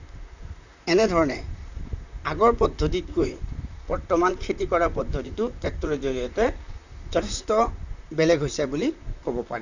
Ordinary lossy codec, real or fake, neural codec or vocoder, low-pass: none; fake; vocoder, 44.1 kHz, 128 mel bands, Pupu-Vocoder; 7.2 kHz